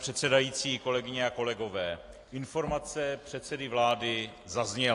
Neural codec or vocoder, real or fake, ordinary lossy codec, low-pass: none; real; AAC, 48 kbps; 10.8 kHz